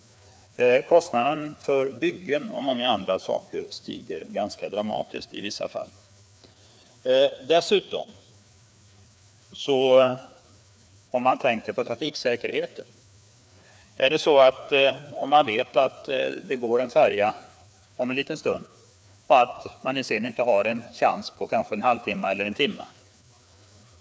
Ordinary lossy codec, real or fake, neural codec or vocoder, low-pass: none; fake; codec, 16 kHz, 2 kbps, FreqCodec, larger model; none